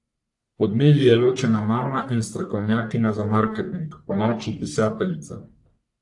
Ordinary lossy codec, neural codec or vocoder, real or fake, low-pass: AAC, 64 kbps; codec, 44.1 kHz, 1.7 kbps, Pupu-Codec; fake; 10.8 kHz